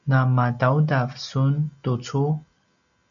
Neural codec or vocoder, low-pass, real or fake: none; 7.2 kHz; real